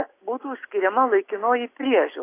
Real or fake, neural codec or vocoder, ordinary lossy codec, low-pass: real; none; AAC, 24 kbps; 3.6 kHz